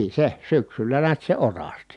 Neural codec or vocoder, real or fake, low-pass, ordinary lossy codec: none; real; 10.8 kHz; none